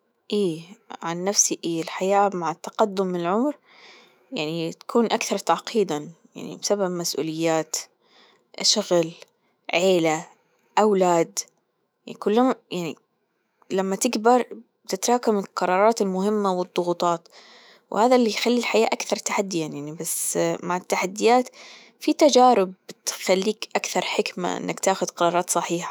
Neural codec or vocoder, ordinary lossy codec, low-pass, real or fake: autoencoder, 48 kHz, 128 numbers a frame, DAC-VAE, trained on Japanese speech; none; none; fake